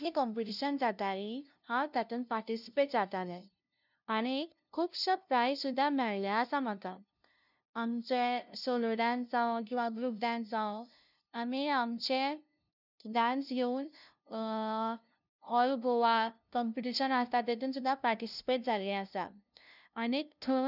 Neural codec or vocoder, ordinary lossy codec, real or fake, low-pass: codec, 16 kHz, 0.5 kbps, FunCodec, trained on LibriTTS, 25 frames a second; none; fake; 5.4 kHz